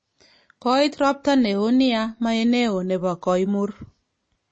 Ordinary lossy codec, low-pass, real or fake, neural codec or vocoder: MP3, 32 kbps; 9.9 kHz; real; none